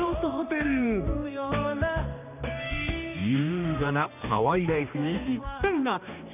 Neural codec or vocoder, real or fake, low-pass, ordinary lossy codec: codec, 16 kHz, 2 kbps, X-Codec, HuBERT features, trained on general audio; fake; 3.6 kHz; none